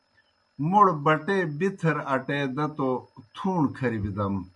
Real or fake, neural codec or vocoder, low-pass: real; none; 10.8 kHz